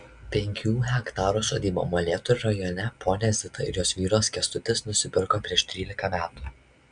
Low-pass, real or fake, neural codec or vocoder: 9.9 kHz; real; none